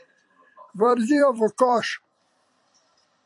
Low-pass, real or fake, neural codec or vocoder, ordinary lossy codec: 10.8 kHz; fake; vocoder, 44.1 kHz, 128 mel bands every 256 samples, BigVGAN v2; AAC, 64 kbps